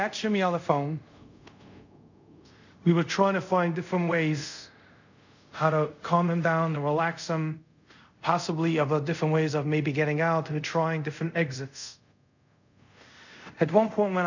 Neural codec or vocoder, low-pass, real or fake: codec, 24 kHz, 0.5 kbps, DualCodec; 7.2 kHz; fake